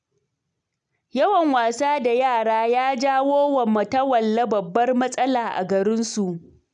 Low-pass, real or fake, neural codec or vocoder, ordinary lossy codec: 9.9 kHz; real; none; none